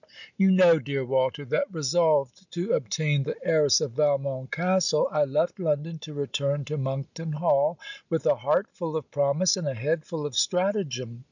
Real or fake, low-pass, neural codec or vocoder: real; 7.2 kHz; none